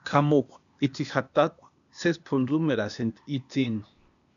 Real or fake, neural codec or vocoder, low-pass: fake; codec, 16 kHz, 0.8 kbps, ZipCodec; 7.2 kHz